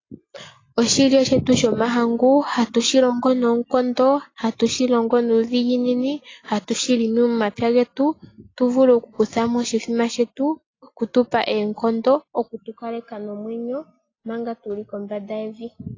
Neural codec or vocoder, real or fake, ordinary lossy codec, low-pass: none; real; AAC, 32 kbps; 7.2 kHz